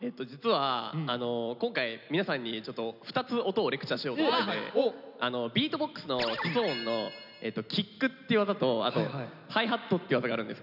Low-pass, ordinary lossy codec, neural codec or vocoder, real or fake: 5.4 kHz; none; none; real